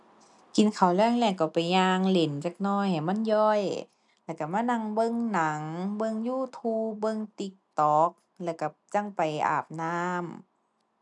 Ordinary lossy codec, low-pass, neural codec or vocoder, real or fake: none; 10.8 kHz; none; real